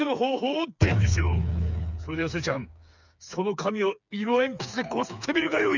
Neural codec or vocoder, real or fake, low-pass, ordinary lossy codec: codec, 16 kHz, 4 kbps, FreqCodec, smaller model; fake; 7.2 kHz; none